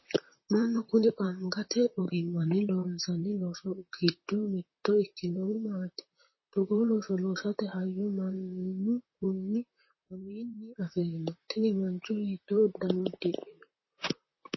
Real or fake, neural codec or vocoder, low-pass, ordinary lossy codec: fake; vocoder, 44.1 kHz, 128 mel bands, Pupu-Vocoder; 7.2 kHz; MP3, 24 kbps